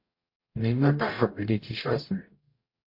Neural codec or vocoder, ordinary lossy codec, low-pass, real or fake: codec, 44.1 kHz, 0.9 kbps, DAC; MP3, 32 kbps; 5.4 kHz; fake